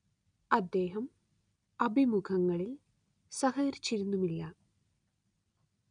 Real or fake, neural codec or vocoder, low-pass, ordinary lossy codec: real; none; 9.9 kHz; none